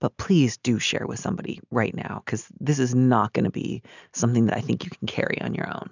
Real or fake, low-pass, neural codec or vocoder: real; 7.2 kHz; none